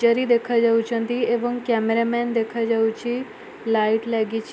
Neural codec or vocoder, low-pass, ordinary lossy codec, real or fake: none; none; none; real